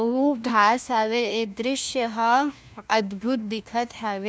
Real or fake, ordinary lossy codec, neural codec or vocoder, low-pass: fake; none; codec, 16 kHz, 1 kbps, FunCodec, trained on LibriTTS, 50 frames a second; none